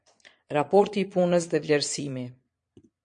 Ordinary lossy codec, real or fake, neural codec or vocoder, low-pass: MP3, 48 kbps; fake; vocoder, 22.05 kHz, 80 mel bands, Vocos; 9.9 kHz